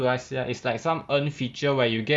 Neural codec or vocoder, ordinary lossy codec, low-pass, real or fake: none; none; none; real